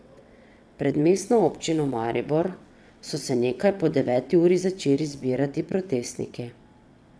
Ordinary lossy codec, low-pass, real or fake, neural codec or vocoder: none; none; fake; vocoder, 22.05 kHz, 80 mel bands, WaveNeXt